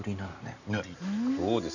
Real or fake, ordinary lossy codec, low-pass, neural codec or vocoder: real; none; 7.2 kHz; none